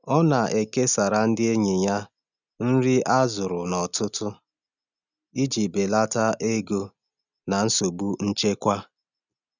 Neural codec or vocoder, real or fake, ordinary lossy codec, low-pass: none; real; none; 7.2 kHz